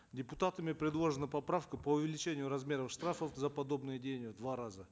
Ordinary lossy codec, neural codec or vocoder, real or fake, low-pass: none; none; real; none